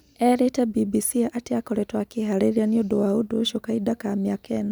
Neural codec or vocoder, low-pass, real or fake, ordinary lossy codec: none; none; real; none